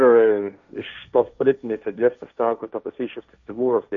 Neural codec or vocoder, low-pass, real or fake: codec, 16 kHz, 1.1 kbps, Voila-Tokenizer; 7.2 kHz; fake